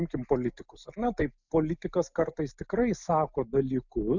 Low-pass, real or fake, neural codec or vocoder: 7.2 kHz; real; none